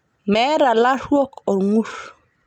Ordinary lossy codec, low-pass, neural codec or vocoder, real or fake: none; 19.8 kHz; none; real